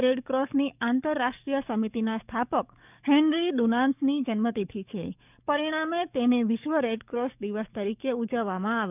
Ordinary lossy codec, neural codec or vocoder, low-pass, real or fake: none; codec, 44.1 kHz, 7.8 kbps, DAC; 3.6 kHz; fake